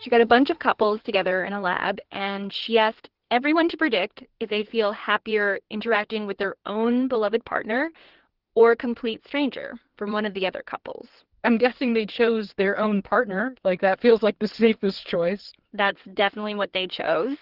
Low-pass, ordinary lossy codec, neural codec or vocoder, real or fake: 5.4 kHz; Opus, 16 kbps; codec, 16 kHz in and 24 kHz out, 2.2 kbps, FireRedTTS-2 codec; fake